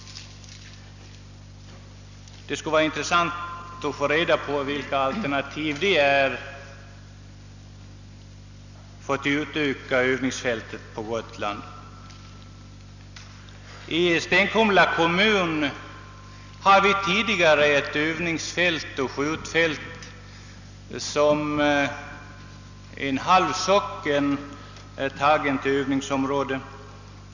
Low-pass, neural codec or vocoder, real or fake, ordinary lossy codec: 7.2 kHz; none; real; none